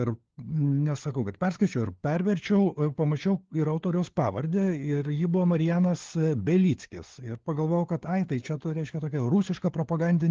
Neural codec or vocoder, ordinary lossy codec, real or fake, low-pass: codec, 16 kHz, 4 kbps, FunCodec, trained on LibriTTS, 50 frames a second; Opus, 16 kbps; fake; 7.2 kHz